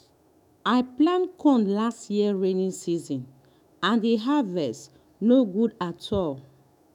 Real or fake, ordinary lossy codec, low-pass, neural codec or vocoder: fake; none; 19.8 kHz; autoencoder, 48 kHz, 128 numbers a frame, DAC-VAE, trained on Japanese speech